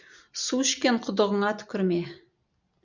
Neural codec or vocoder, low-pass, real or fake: none; 7.2 kHz; real